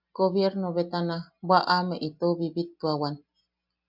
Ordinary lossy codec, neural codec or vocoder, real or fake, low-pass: MP3, 48 kbps; none; real; 5.4 kHz